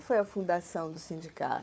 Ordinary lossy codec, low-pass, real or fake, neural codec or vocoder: none; none; fake; codec, 16 kHz, 4 kbps, FunCodec, trained on Chinese and English, 50 frames a second